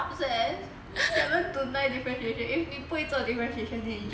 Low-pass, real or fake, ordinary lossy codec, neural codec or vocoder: none; real; none; none